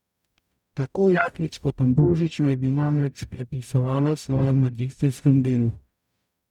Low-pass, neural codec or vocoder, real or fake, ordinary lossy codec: 19.8 kHz; codec, 44.1 kHz, 0.9 kbps, DAC; fake; none